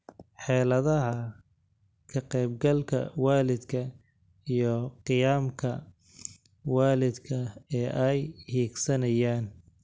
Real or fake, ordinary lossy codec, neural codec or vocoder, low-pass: real; none; none; none